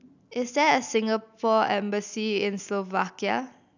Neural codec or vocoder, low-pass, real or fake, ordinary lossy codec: none; 7.2 kHz; real; none